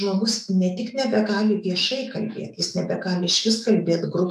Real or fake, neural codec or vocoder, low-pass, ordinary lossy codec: fake; autoencoder, 48 kHz, 128 numbers a frame, DAC-VAE, trained on Japanese speech; 14.4 kHz; AAC, 96 kbps